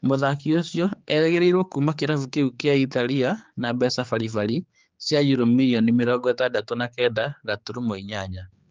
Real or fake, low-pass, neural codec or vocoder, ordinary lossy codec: fake; 7.2 kHz; codec, 16 kHz, 4 kbps, X-Codec, HuBERT features, trained on general audio; Opus, 24 kbps